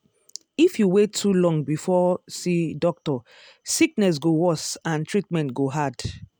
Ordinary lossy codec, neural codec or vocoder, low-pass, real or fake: none; none; none; real